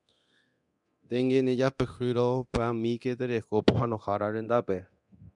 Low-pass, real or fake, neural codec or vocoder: 10.8 kHz; fake; codec, 24 kHz, 0.9 kbps, DualCodec